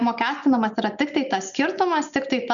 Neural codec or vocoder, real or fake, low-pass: none; real; 7.2 kHz